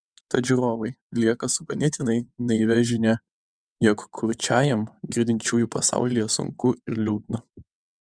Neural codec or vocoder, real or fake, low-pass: vocoder, 22.05 kHz, 80 mel bands, WaveNeXt; fake; 9.9 kHz